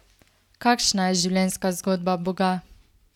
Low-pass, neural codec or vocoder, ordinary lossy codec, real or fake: 19.8 kHz; none; none; real